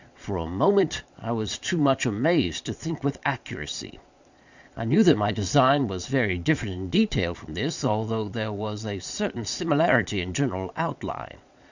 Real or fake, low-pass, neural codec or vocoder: fake; 7.2 kHz; vocoder, 22.05 kHz, 80 mel bands, Vocos